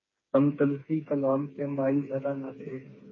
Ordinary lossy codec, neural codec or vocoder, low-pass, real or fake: MP3, 32 kbps; codec, 16 kHz, 4 kbps, FreqCodec, smaller model; 7.2 kHz; fake